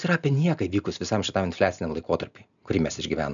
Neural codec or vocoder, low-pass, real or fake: none; 7.2 kHz; real